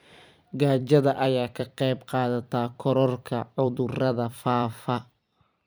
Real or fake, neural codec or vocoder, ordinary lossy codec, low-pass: fake; vocoder, 44.1 kHz, 128 mel bands every 256 samples, BigVGAN v2; none; none